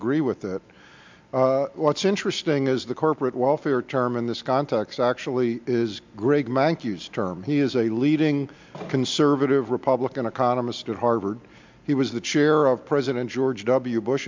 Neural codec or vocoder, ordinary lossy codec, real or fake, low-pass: none; MP3, 64 kbps; real; 7.2 kHz